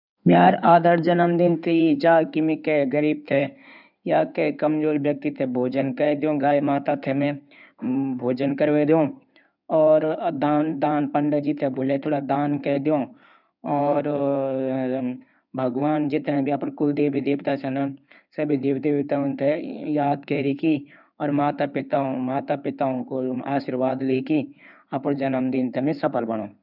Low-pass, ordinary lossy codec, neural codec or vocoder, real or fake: 5.4 kHz; none; codec, 16 kHz in and 24 kHz out, 2.2 kbps, FireRedTTS-2 codec; fake